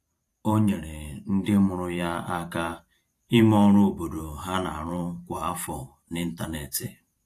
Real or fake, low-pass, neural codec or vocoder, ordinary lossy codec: fake; 14.4 kHz; vocoder, 44.1 kHz, 128 mel bands every 512 samples, BigVGAN v2; AAC, 64 kbps